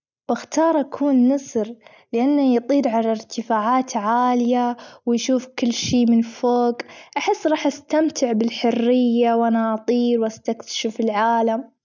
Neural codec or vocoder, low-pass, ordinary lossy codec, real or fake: none; 7.2 kHz; none; real